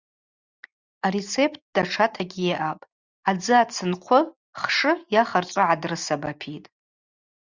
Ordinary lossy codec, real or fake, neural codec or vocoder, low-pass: Opus, 64 kbps; real; none; 7.2 kHz